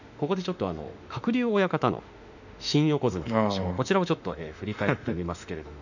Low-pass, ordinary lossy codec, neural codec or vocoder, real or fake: 7.2 kHz; none; autoencoder, 48 kHz, 32 numbers a frame, DAC-VAE, trained on Japanese speech; fake